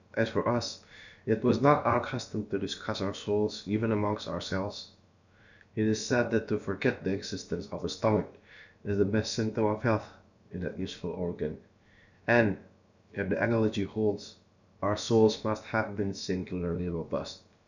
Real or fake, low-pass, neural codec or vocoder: fake; 7.2 kHz; codec, 16 kHz, about 1 kbps, DyCAST, with the encoder's durations